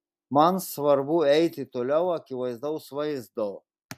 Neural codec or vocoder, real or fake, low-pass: none; real; 14.4 kHz